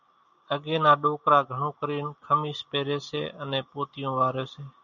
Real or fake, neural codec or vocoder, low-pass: real; none; 7.2 kHz